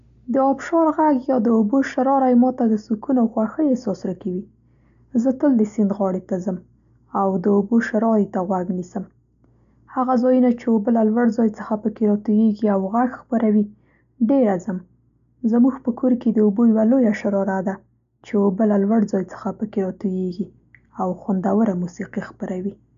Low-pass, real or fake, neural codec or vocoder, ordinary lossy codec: 7.2 kHz; real; none; none